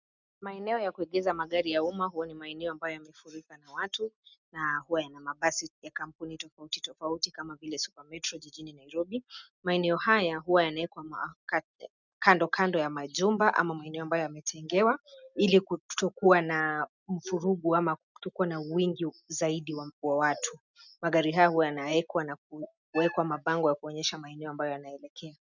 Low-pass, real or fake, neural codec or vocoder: 7.2 kHz; real; none